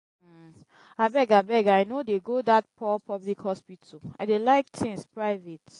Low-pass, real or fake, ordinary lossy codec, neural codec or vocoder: 10.8 kHz; real; AAC, 48 kbps; none